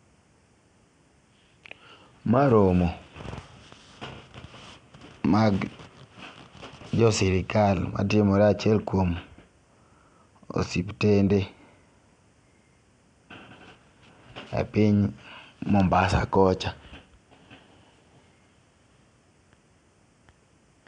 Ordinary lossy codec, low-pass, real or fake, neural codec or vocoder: MP3, 96 kbps; 9.9 kHz; real; none